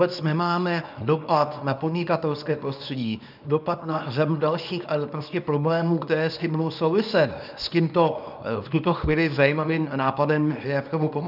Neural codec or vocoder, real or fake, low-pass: codec, 24 kHz, 0.9 kbps, WavTokenizer, small release; fake; 5.4 kHz